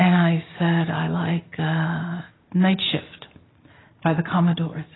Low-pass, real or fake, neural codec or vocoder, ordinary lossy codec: 7.2 kHz; real; none; AAC, 16 kbps